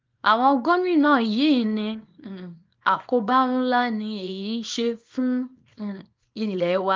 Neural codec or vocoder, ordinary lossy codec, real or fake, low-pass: codec, 24 kHz, 0.9 kbps, WavTokenizer, small release; Opus, 32 kbps; fake; 7.2 kHz